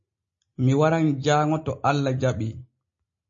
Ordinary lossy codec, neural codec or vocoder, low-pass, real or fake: MP3, 32 kbps; none; 7.2 kHz; real